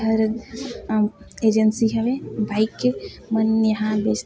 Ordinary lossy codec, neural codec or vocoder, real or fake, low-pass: none; none; real; none